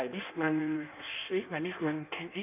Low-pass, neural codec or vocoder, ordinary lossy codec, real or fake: 3.6 kHz; codec, 16 kHz in and 24 kHz out, 1.1 kbps, FireRedTTS-2 codec; none; fake